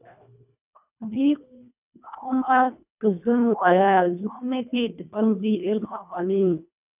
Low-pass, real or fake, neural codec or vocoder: 3.6 kHz; fake; codec, 24 kHz, 1.5 kbps, HILCodec